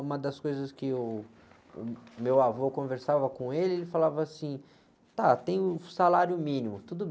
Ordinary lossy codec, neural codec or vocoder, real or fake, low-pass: none; none; real; none